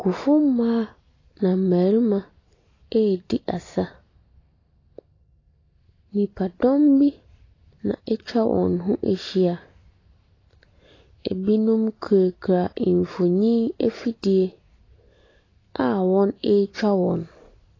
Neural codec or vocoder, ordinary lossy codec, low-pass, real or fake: none; AAC, 32 kbps; 7.2 kHz; real